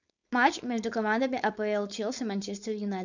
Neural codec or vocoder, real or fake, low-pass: codec, 16 kHz, 4.8 kbps, FACodec; fake; 7.2 kHz